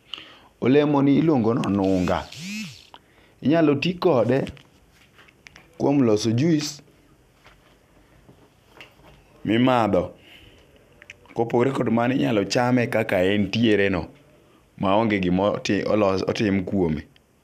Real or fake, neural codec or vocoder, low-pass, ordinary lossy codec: real; none; 14.4 kHz; none